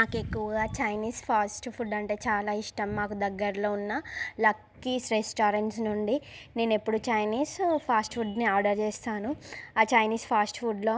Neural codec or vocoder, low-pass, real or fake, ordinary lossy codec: none; none; real; none